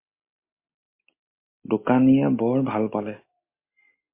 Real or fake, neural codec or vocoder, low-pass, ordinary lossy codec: real; none; 3.6 kHz; MP3, 24 kbps